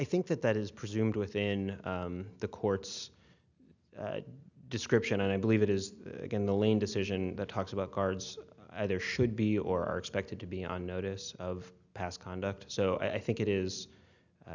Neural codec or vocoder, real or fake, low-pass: none; real; 7.2 kHz